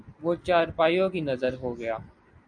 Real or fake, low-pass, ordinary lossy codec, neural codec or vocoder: real; 9.9 kHz; MP3, 96 kbps; none